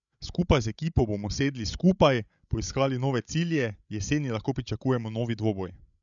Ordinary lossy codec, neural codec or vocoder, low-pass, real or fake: none; codec, 16 kHz, 16 kbps, FreqCodec, larger model; 7.2 kHz; fake